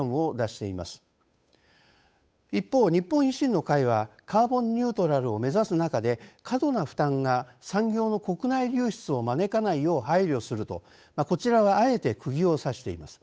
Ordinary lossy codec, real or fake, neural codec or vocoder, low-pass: none; fake; codec, 16 kHz, 8 kbps, FunCodec, trained on Chinese and English, 25 frames a second; none